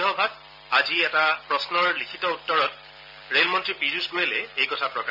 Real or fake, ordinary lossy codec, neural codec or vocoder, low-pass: real; none; none; 5.4 kHz